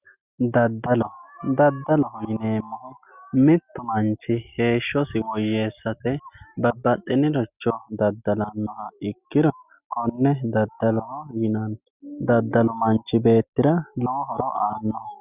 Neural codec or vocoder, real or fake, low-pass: none; real; 3.6 kHz